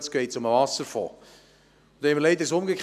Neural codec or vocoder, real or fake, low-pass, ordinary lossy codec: none; real; 14.4 kHz; none